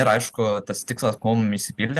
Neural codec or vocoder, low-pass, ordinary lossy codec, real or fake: none; 14.4 kHz; Opus, 24 kbps; real